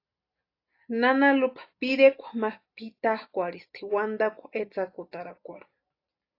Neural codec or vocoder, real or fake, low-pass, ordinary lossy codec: none; real; 5.4 kHz; AAC, 32 kbps